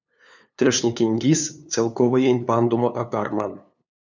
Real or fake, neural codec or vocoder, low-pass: fake; codec, 16 kHz, 2 kbps, FunCodec, trained on LibriTTS, 25 frames a second; 7.2 kHz